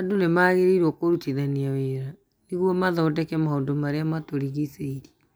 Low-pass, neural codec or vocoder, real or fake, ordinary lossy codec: none; none; real; none